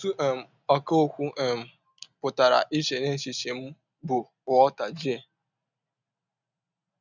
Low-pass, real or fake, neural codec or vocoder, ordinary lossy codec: 7.2 kHz; real; none; none